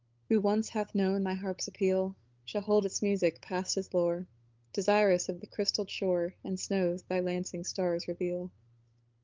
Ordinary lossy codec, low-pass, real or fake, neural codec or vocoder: Opus, 32 kbps; 7.2 kHz; fake; codec, 16 kHz, 8 kbps, FunCodec, trained on LibriTTS, 25 frames a second